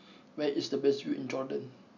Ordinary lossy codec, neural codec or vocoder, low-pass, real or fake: none; none; 7.2 kHz; real